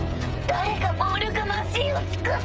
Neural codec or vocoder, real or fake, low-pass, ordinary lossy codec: codec, 16 kHz, 16 kbps, FreqCodec, smaller model; fake; none; none